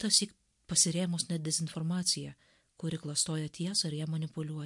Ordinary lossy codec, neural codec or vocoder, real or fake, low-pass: MP3, 64 kbps; none; real; 10.8 kHz